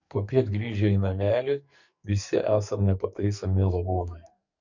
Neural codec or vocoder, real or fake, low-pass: codec, 44.1 kHz, 2.6 kbps, SNAC; fake; 7.2 kHz